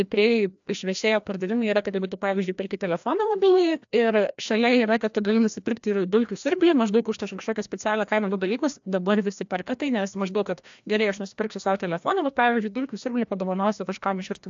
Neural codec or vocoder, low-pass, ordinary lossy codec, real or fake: codec, 16 kHz, 1 kbps, FreqCodec, larger model; 7.2 kHz; AAC, 64 kbps; fake